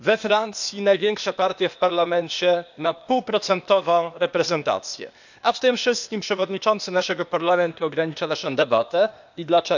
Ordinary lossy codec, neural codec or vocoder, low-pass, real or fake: none; codec, 16 kHz, 0.8 kbps, ZipCodec; 7.2 kHz; fake